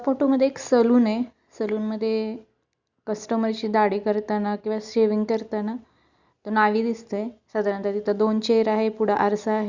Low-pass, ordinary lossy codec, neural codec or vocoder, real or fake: 7.2 kHz; Opus, 64 kbps; none; real